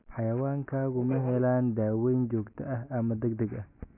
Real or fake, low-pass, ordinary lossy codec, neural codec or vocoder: real; 3.6 kHz; none; none